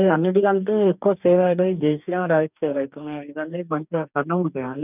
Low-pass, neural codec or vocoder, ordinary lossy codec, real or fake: 3.6 kHz; codec, 44.1 kHz, 2.6 kbps, DAC; none; fake